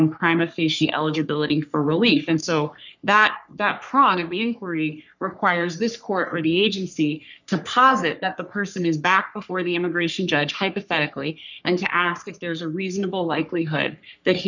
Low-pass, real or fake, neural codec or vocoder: 7.2 kHz; fake; codec, 44.1 kHz, 3.4 kbps, Pupu-Codec